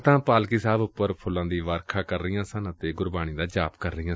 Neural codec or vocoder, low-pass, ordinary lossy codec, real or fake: none; none; none; real